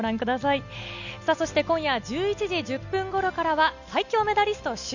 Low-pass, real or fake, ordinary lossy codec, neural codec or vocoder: 7.2 kHz; real; none; none